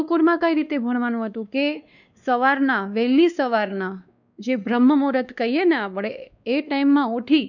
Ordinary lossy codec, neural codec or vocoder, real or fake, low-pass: none; codec, 16 kHz, 2 kbps, X-Codec, WavLM features, trained on Multilingual LibriSpeech; fake; 7.2 kHz